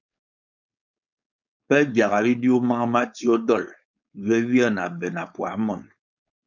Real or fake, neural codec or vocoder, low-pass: fake; codec, 16 kHz, 4.8 kbps, FACodec; 7.2 kHz